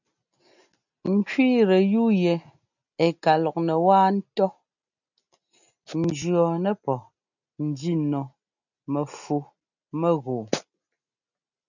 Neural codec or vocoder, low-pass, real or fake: none; 7.2 kHz; real